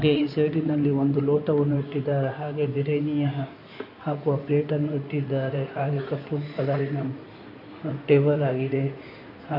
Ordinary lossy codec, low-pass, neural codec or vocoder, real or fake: none; 5.4 kHz; codec, 16 kHz in and 24 kHz out, 2.2 kbps, FireRedTTS-2 codec; fake